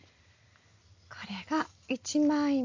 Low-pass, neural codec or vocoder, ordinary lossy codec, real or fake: 7.2 kHz; none; AAC, 48 kbps; real